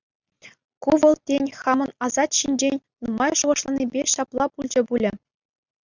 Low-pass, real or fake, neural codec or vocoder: 7.2 kHz; real; none